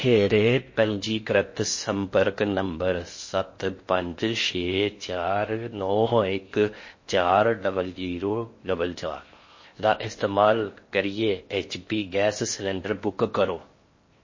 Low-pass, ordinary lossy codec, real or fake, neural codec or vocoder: 7.2 kHz; MP3, 32 kbps; fake; codec, 16 kHz in and 24 kHz out, 0.6 kbps, FocalCodec, streaming, 2048 codes